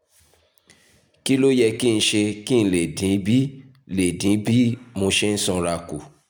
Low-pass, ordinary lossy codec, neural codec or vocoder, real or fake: none; none; none; real